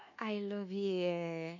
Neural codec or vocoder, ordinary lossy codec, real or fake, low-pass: codec, 24 kHz, 1.2 kbps, DualCodec; none; fake; 7.2 kHz